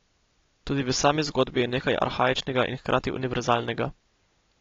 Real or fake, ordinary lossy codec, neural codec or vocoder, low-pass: real; AAC, 32 kbps; none; 7.2 kHz